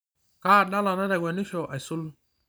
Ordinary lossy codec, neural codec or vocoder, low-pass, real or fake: none; vocoder, 44.1 kHz, 128 mel bands every 512 samples, BigVGAN v2; none; fake